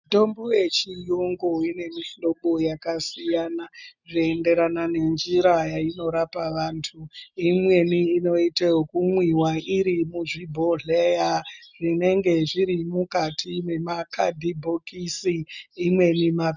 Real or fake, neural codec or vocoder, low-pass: real; none; 7.2 kHz